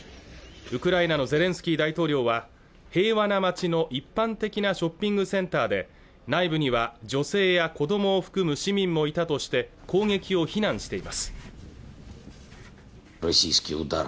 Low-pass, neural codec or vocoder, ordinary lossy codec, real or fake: none; none; none; real